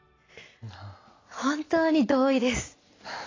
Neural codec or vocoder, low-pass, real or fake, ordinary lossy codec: none; 7.2 kHz; real; AAC, 32 kbps